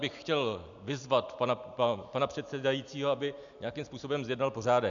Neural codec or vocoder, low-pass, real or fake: none; 7.2 kHz; real